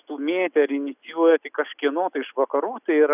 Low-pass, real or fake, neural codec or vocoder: 3.6 kHz; real; none